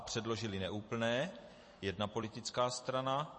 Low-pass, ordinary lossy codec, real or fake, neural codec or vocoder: 10.8 kHz; MP3, 32 kbps; fake; vocoder, 44.1 kHz, 128 mel bands every 256 samples, BigVGAN v2